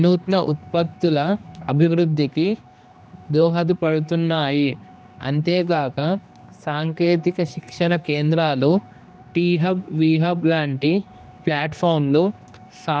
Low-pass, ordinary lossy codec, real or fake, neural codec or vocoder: none; none; fake; codec, 16 kHz, 2 kbps, X-Codec, HuBERT features, trained on general audio